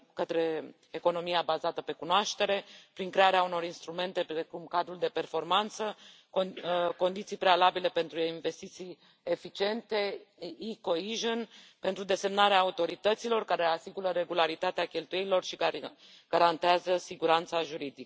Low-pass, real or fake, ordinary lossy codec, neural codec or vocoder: none; real; none; none